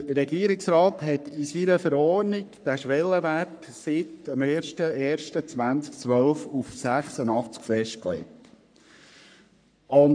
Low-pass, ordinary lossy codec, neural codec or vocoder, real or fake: 9.9 kHz; none; codec, 44.1 kHz, 3.4 kbps, Pupu-Codec; fake